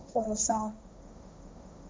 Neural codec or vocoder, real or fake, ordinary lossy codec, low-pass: codec, 16 kHz, 1.1 kbps, Voila-Tokenizer; fake; none; none